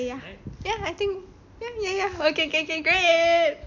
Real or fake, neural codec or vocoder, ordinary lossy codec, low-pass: real; none; none; 7.2 kHz